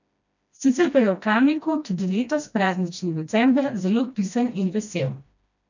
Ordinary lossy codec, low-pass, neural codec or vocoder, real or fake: none; 7.2 kHz; codec, 16 kHz, 1 kbps, FreqCodec, smaller model; fake